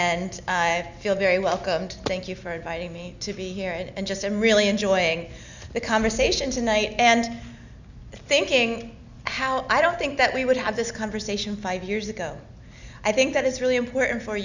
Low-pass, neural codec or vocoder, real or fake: 7.2 kHz; none; real